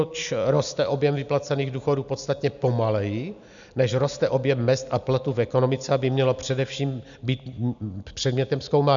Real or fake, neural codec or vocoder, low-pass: real; none; 7.2 kHz